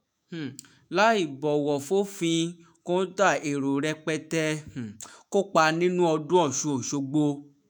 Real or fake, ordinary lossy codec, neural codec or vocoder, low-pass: fake; none; autoencoder, 48 kHz, 128 numbers a frame, DAC-VAE, trained on Japanese speech; none